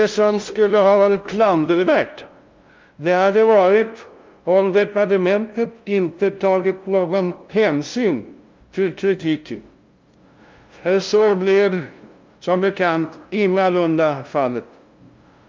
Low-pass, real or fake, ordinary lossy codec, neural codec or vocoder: 7.2 kHz; fake; Opus, 32 kbps; codec, 16 kHz, 0.5 kbps, FunCodec, trained on LibriTTS, 25 frames a second